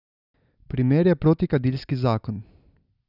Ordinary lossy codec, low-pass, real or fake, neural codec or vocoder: none; 5.4 kHz; real; none